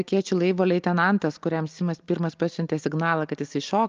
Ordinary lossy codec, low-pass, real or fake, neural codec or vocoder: Opus, 24 kbps; 7.2 kHz; real; none